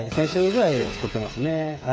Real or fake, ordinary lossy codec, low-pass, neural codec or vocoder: fake; none; none; codec, 16 kHz, 8 kbps, FreqCodec, smaller model